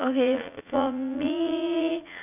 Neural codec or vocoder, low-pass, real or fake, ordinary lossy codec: vocoder, 44.1 kHz, 80 mel bands, Vocos; 3.6 kHz; fake; none